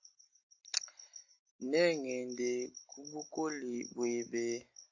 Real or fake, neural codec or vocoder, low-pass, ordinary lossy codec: real; none; 7.2 kHz; AAC, 48 kbps